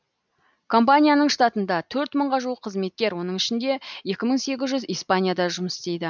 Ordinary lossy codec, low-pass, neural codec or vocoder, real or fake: none; 7.2 kHz; none; real